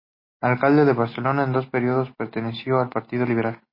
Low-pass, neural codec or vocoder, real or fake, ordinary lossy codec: 5.4 kHz; none; real; MP3, 24 kbps